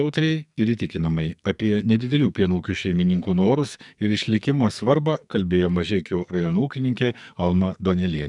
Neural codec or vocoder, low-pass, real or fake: codec, 44.1 kHz, 2.6 kbps, SNAC; 10.8 kHz; fake